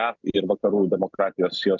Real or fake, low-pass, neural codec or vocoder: real; 7.2 kHz; none